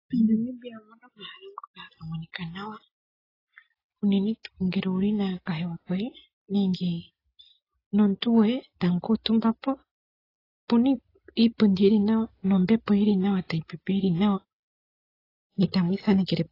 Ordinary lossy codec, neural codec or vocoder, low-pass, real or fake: AAC, 24 kbps; none; 5.4 kHz; real